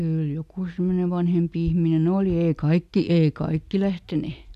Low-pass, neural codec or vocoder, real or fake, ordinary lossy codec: 14.4 kHz; none; real; none